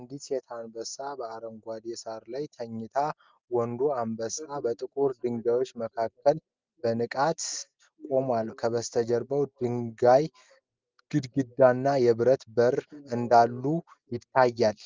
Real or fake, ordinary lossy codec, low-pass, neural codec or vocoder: real; Opus, 24 kbps; 7.2 kHz; none